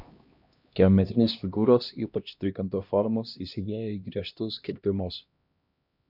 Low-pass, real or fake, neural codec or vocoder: 5.4 kHz; fake; codec, 16 kHz, 1 kbps, X-Codec, HuBERT features, trained on LibriSpeech